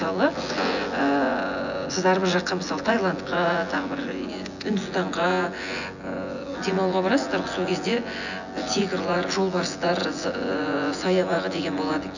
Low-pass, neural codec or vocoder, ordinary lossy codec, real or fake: 7.2 kHz; vocoder, 24 kHz, 100 mel bands, Vocos; none; fake